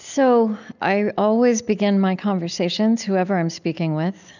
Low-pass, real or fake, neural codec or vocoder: 7.2 kHz; real; none